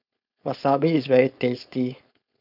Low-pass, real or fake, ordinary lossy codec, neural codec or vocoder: 5.4 kHz; fake; none; codec, 16 kHz, 4.8 kbps, FACodec